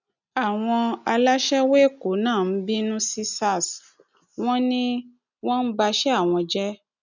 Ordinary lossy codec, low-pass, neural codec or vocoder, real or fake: none; 7.2 kHz; none; real